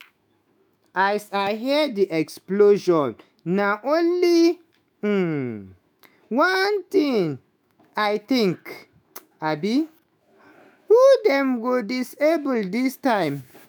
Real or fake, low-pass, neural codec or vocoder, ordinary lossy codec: fake; none; autoencoder, 48 kHz, 128 numbers a frame, DAC-VAE, trained on Japanese speech; none